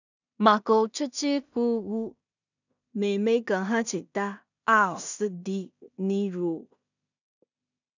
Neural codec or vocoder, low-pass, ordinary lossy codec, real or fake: codec, 16 kHz in and 24 kHz out, 0.4 kbps, LongCat-Audio-Codec, two codebook decoder; 7.2 kHz; none; fake